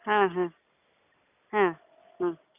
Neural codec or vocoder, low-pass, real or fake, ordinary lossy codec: none; 3.6 kHz; real; none